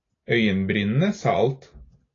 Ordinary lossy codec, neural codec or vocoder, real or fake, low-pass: AAC, 32 kbps; none; real; 7.2 kHz